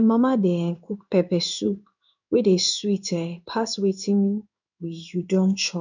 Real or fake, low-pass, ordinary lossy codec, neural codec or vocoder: fake; 7.2 kHz; none; codec, 16 kHz in and 24 kHz out, 1 kbps, XY-Tokenizer